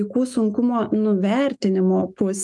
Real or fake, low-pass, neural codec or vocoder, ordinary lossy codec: fake; 10.8 kHz; autoencoder, 48 kHz, 128 numbers a frame, DAC-VAE, trained on Japanese speech; Opus, 32 kbps